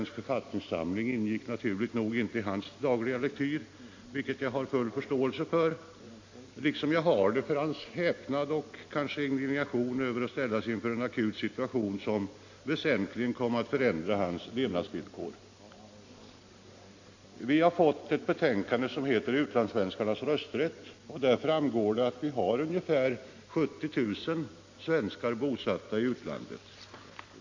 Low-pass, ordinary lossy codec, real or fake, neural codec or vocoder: 7.2 kHz; AAC, 48 kbps; real; none